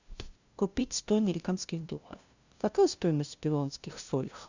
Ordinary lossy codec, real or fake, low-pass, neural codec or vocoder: Opus, 64 kbps; fake; 7.2 kHz; codec, 16 kHz, 0.5 kbps, FunCodec, trained on LibriTTS, 25 frames a second